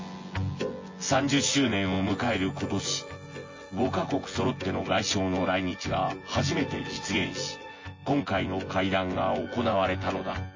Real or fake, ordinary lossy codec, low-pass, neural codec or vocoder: fake; MP3, 32 kbps; 7.2 kHz; vocoder, 24 kHz, 100 mel bands, Vocos